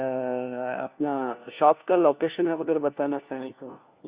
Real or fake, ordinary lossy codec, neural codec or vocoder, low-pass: fake; Opus, 64 kbps; codec, 16 kHz, 1 kbps, FunCodec, trained on LibriTTS, 50 frames a second; 3.6 kHz